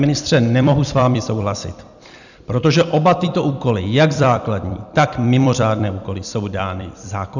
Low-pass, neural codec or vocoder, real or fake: 7.2 kHz; vocoder, 44.1 kHz, 128 mel bands every 256 samples, BigVGAN v2; fake